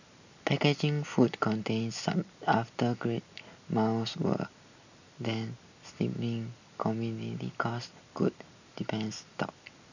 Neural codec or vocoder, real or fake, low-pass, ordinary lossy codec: none; real; 7.2 kHz; none